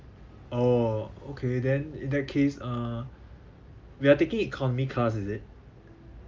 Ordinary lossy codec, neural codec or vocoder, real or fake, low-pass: Opus, 32 kbps; none; real; 7.2 kHz